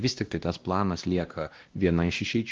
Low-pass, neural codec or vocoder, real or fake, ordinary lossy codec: 7.2 kHz; codec, 16 kHz, 1 kbps, X-Codec, WavLM features, trained on Multilingual LibriSpeech; fake; Opus, 16 kbps